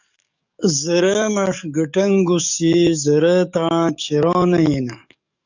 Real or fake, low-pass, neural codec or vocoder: fake; 7.2 kHz; codec, 44.1 kHz, 7.8 kbps, DAC